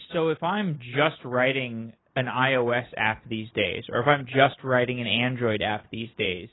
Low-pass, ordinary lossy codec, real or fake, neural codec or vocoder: 7.2 kHz; AAC, 16 kbps; real; none